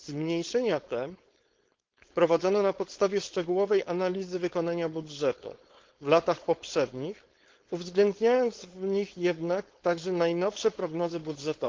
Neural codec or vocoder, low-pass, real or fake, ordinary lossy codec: codec, 16 kHz, 4.8 kbps, FACodec; 7.2 kHz; fake; Opus, 16 kbps